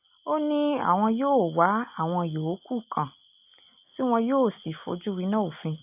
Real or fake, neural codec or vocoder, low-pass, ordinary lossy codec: real; none; 3.6 kHz; AAC, 32 kbps